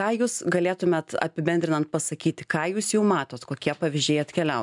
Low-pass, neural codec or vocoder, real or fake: 10.8 kHz; none; real